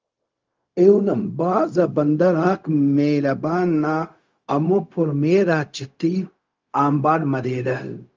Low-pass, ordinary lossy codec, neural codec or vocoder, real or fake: 7.2 kHz; Opus, 32 kbps; codec, 16 kHz, 0.4 kbps, LongCat-Audio-Codec; fake